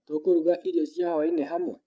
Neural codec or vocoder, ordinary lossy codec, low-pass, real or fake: codec, 16 kHz, 8 kbps, FreqCodec, larger model; none; none; fake